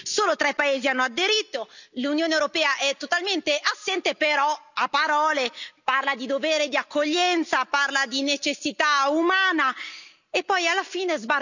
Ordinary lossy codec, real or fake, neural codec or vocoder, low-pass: none; real; none; 7.2 kHz